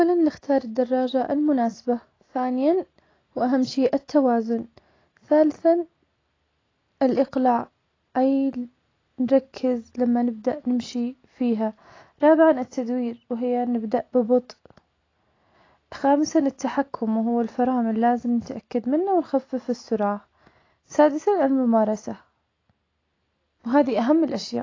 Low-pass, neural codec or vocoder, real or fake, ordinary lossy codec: 7.2 kHz; none; real; AAC, 32 kbps